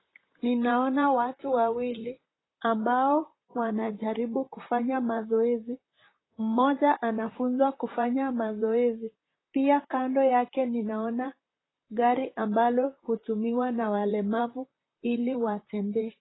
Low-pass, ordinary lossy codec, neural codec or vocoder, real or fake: 7.2 kHz; AAC, 16 kbps; vocoder, 44.1 kHz, 128 mel bands, Pupu-Vocoder; fake